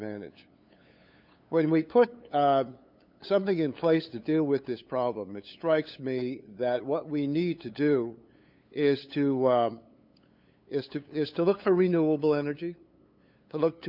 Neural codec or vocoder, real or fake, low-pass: codec, 16 kHz, 8 kbps, FunCodec, trained on LibriTTS, 25 frames a second; fake; 5.4 kHz